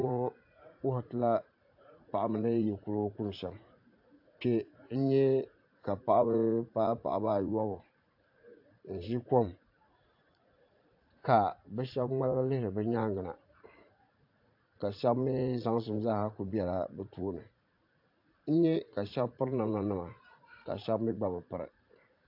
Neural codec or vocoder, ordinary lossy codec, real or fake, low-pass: vocoder, 44.1 kHz, 80 mel bands, Vocos; AAC, 48 kbps; fake; 5.4 kHz